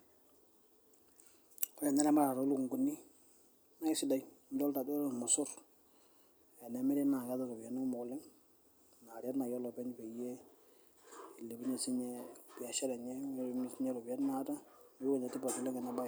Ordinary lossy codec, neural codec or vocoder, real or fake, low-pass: none; none; real; none